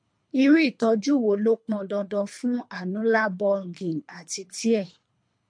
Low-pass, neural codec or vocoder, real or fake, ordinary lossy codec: 9.9 kHz; codec, 24 kHz, 3 kbps, HILCodec; fake; MP3, 48 kbps